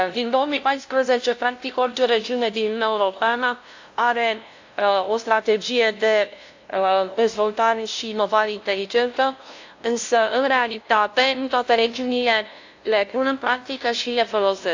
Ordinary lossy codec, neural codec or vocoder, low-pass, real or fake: AAC, 48 kbps; codec, 16 kHz, 0.5 kbps, FunCodec, trained on LibriTTS, 25 frames a second; 7.2 kHz; fake